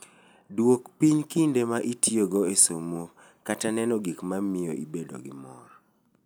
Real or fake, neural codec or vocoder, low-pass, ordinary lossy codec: real; none; none; none